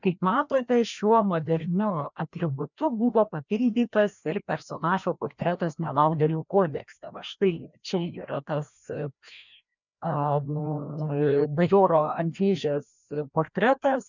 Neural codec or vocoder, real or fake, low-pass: codec, 16 kHz, 1 kbps, FreqCodec, larger model; fake; 7.2 kHz